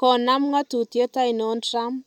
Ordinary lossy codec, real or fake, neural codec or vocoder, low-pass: none; real; none; 19.8 kHz